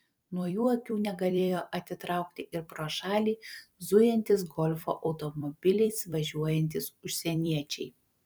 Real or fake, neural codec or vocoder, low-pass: fake; vocoder, 44.1 kHz, 128 mel bands every 256 samples, BigVGAN v2; 19.8 kHz